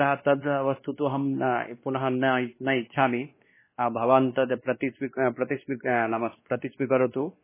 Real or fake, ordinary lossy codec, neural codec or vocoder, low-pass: fake; MP3, 16 kbps; codec, 16 kHz, 2 kbps, X-Codec, WavLM features, trained on Multilingual LibriSpeech; 3.6 kHz